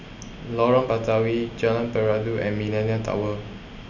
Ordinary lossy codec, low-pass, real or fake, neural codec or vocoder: none; 7.2 kHz; real; none